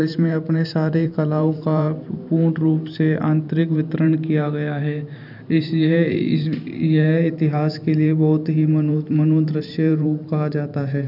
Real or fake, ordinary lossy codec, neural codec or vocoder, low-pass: fake; none; vocoder, 44.1 kHz, 128 mel bands every 512 samples, BigVGAN v2; 5.4 kHz